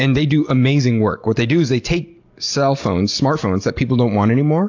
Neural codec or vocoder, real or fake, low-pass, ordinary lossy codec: none; real; 7.2 kHz; AAC, 48 kbps